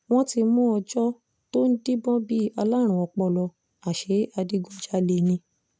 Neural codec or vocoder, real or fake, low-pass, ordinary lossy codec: none; real; none; none